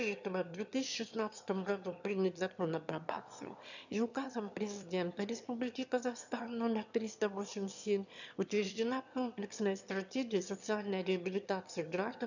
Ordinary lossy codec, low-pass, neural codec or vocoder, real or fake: none; 7.2 kHz; autoencoder, 22.05 kHz, a latent of 192 numbers a frame, VITS, trained on one speaker; fake